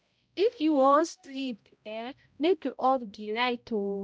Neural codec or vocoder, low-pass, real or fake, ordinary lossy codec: codec, 16 kHz, 0.5 kbps, X-Codec, HuBERT features, trained on balanced general audio; none; fake; none